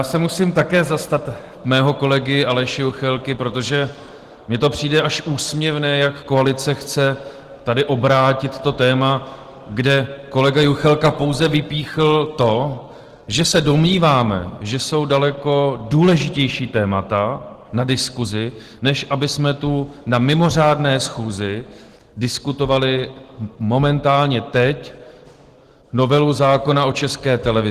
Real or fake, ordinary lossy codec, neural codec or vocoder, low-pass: real; Opus, 16 kbps; none; 14.4 kHz